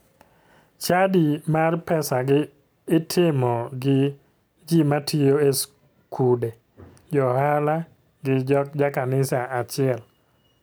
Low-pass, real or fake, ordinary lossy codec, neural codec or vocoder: none; real; none; none